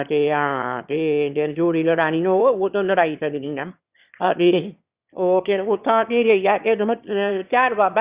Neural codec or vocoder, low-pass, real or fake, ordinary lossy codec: autoencoder, 22.05 kHz, a latent of 192 numbers a frame, VITS, trained on one speaker; 3.6 kHz; fake; Opus, 64 kbps